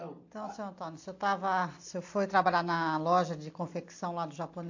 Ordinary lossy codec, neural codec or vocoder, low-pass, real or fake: AAC, 48 kbps; none; 7.2 kHz; real